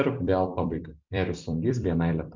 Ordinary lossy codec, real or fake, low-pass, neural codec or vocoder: MP3, 64 kbps; fake; 7.2 kHz; vocoder, 44.1 kHz, 128 mel bands every 256 samples, BigVGAN v2